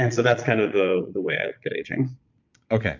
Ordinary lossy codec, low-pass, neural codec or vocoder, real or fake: MP3, 64 kbps; 7.2 kHz; vocoder, 22.05 kHz, 80 mel bands, Vocos; fake